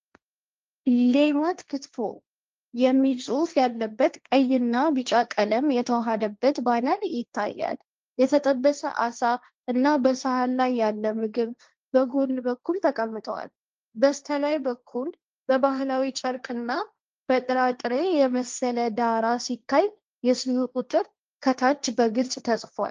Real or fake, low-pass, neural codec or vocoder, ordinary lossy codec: fake; 7.2 kHz; codec, 16 kHz, 1.1 kbps, Voila-Tokenizer; Opus, 24 kbps